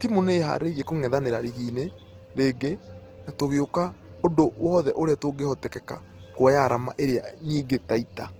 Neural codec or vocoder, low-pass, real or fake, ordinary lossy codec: none; 19.8 kHz; real; Opus, 16 kbps